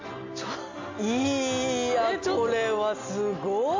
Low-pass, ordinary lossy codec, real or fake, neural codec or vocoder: 7.2 kHz; MP3, 48 kbps; real; none